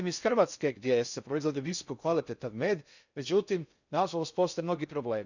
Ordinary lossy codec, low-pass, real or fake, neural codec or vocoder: none; 7.2 kHz; fake; codec, 16 kHz in and 24 kHz out, 0.6 kbps, FocalCodec, streaming, 4096 codes